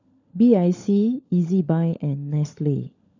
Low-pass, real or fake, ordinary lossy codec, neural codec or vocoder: 7.2 kHz; fake; none; codec, 16 kHz, 16 kbps, FunCodec, trained on LibriTTS, 50 frames a second